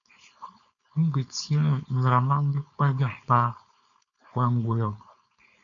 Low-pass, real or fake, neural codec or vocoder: 7.2 kHz; fake; codec, 16 kHz, 4.8 kbps, FACodec